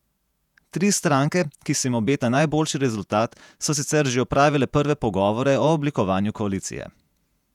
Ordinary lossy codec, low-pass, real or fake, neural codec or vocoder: none; 19.8 kHz; fake; vocoder, 48 kHz, 128 mel bands, Vocos